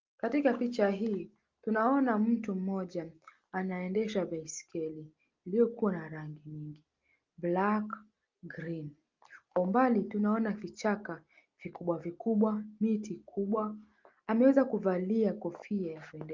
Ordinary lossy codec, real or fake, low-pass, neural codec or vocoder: Opus, 32 kbps; real; 7.2 kHz; none